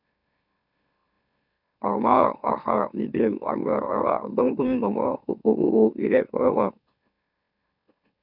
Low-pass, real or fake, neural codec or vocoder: 5.4 kHz; fake; autoencoder, 44.1 kHz, a latent of 192 numbers a frame, MeloTTS